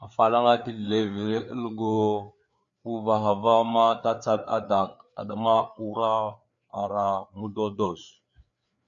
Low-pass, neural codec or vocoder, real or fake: 7.2 kHz; codec, 16 kHz, 4 kbps, FreqCodec, larger model; fake